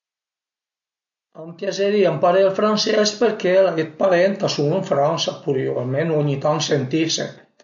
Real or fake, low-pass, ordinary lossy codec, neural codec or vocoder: real; 7.2 kHz; MP3, 48 kbps; none